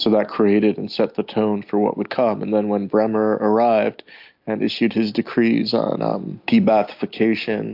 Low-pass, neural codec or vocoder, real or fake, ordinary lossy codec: 5.4 kHz; none; real; AAC, 48 kbps